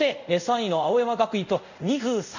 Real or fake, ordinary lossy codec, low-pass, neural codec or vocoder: fake; none; 7.2 kHz; codec, 24 kHz, 0.5 kbps, DualCodec